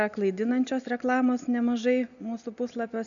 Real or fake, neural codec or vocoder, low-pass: real; none; 7.2 kHz